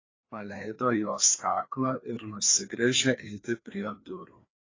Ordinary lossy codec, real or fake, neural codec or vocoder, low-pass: AAC, 32 kbps; fake; codec, 16 kHz, 2 kbps, FreqCodec, larger model; 7.2 kHz